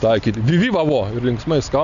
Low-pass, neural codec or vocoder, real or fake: 7.2 kHz; none; real